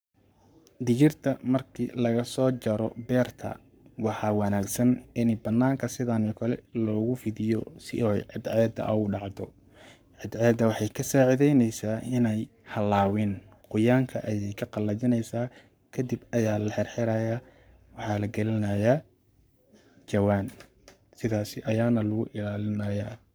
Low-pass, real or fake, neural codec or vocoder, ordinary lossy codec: none; fake; codec, 44.1 kHz, 7.8 kbps, Pupu-Codec; none